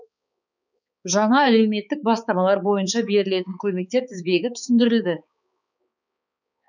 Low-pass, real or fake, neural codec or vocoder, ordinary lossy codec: 7.2 kHz; fake; codec, 16 kHz, 4 kbps, X-Codec, HuBERT features, trained on balanced general audio; none